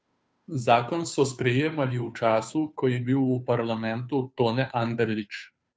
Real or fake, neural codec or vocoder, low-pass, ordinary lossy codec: fake; codec, 16 kHz, 2 kbps, FunCodec, trained on Chinese and English, 25 frames a second; none; none